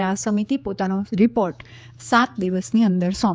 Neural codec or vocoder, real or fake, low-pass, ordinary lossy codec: codec, 16 kHz, 4 kbps, X-Codec, HuBERT features, trained on general audio; fake; none; none